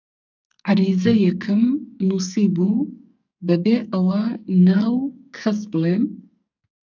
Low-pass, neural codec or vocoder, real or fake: 7.2 kHz; codec, 44.1 kHz, 2.6 kbps, SNAC; fake